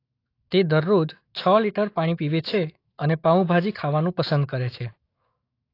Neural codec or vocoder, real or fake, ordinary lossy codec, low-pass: autoencoder, 48 kHz, 128 numbers a frame, DAC-VAE, trained on Japanese speech; fake; AAC, 32 kbps; 5.4 kHz